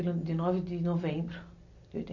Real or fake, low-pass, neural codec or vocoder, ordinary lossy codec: real; 7.2 kHz; none; none